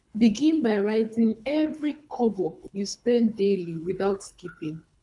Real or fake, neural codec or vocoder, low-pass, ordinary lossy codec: fake; codec, 24 kHz, 3 kbps, HILCodec; 10.8 kHz; none